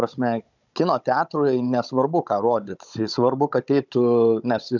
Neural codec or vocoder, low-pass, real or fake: codec, 16 kHz, 16 kbps, FunCodec, trained on Chinese and English, 50 frames a second; 7.2 kHz; fake